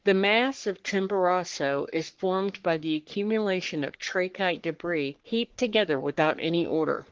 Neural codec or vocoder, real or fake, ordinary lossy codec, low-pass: codec, 44.1 kHz, 3.4 kbps, Pupu-Codec; fake; Opus, 16 kbps; 7.2 kHz